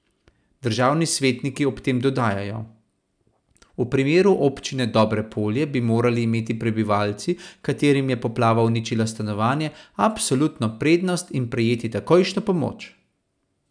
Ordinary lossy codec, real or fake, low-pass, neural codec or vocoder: none; real; 9.9 kHz; none